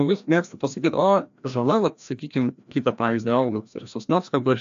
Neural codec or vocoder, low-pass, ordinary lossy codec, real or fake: codec, 16 kHz, 1 kbps, FreqCodec, larger model; 7.2 kHz; AAC, 64 kbps; fake